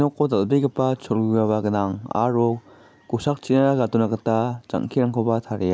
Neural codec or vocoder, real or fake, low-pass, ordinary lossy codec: none; real; none; none